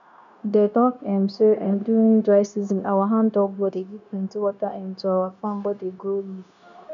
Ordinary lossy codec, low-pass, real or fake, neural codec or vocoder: none; 7.2 kHz; fake; codec, 16 kHz, 0.9 kbps, LongCat-Audio-Codec